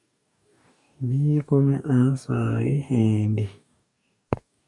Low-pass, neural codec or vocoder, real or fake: 10.8 kHz; codec, 44.1 kHz, 2.6 kbps, DAC; fake